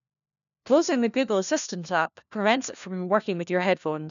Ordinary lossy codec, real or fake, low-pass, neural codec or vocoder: none; fake; 7.2 kHz; codec, 16 kHz, 1 kbps, FunCodec, trained on LibriTTS, 50 frames a second